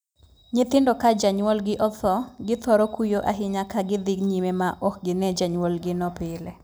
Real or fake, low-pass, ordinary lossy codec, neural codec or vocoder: real; none; none; none